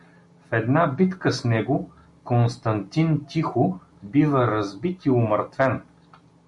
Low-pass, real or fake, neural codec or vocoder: 10.8 kHz; real; none